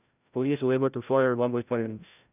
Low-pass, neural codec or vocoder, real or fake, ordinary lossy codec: 3.6 kHz; codec, 16 kHz, 0.5 kbps, FreqCodec, larger model; fake; none